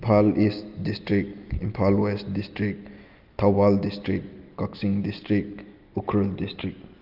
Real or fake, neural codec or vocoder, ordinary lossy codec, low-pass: real; none; Opus, 24 kbps; 5.4 kHz